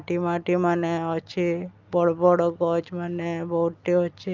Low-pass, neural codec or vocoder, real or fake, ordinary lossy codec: 7.2 kHz; none; real; Opus, 32 kbps